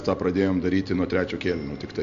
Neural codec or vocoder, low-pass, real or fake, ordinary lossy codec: none; 7.2 kHz; real; MP3, 48 kbps